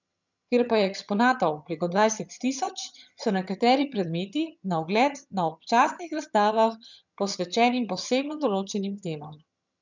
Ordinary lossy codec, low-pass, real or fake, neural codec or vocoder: none; 7.2 kHz; fake; vocoder, 22.05 kHz, 80 mel bands, HiFi-GAN